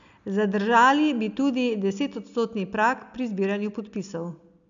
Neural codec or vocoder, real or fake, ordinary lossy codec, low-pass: none; real; none; 7.2 kHz